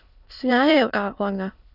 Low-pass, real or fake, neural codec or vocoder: 5.4 kHz; fake; autoencoder, 22.05 kHz, a latent of 192 numbers a frame, VITS, trained on many speakers